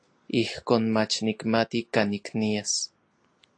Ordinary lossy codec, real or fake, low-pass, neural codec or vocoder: AAC, 48 kbps; real; 9.9 kHz; none